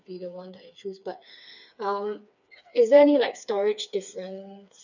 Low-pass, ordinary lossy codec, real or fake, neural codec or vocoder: 7.2 kHz; none; fake; codec, 16 kHz, 4 kbps, FreqCodec, smaller model